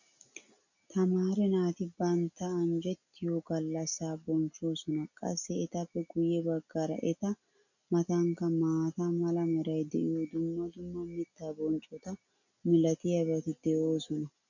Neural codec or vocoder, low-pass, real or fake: none; 7.2 kHz; real